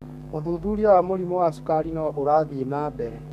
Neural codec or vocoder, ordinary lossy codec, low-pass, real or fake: codec, 32 kHz, 1.9 kbps, SNAC; none; 14.4 kHz; fake